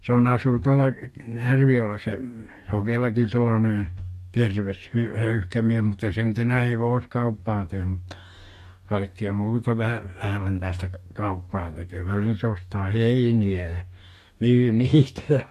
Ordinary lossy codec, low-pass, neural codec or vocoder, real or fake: MP3, 64 kbps; 14.4 kHz; codec, 44.1 kHz, 2.6 kbps, DAC; fake